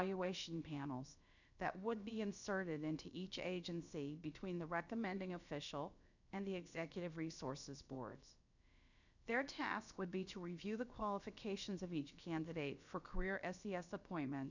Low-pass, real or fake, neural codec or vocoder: 7.2 kHz; fake; codec, 16 kHz, about 1 kbps, DyCAST, with the encoder's durations